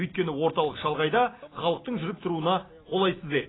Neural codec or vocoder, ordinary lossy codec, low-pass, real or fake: none; AAC, 16 kbps; 7.2 kHz; real